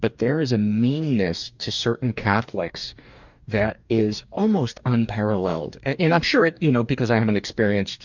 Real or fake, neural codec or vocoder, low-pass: fake; codec, 44.1 kHz, 2.6 kbps, DAC; 7.2 kHz